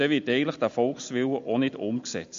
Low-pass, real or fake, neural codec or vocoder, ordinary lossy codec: 7.2 kHz; real; none; MP3, 48 kbps